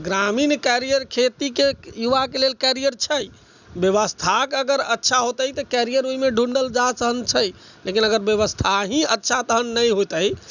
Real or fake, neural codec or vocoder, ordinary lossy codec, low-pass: real; none; none; 7.2 kHz